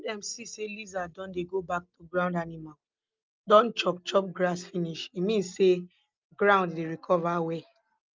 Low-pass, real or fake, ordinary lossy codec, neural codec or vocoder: 7.2 kHz; real; Opus, 24 kbps; none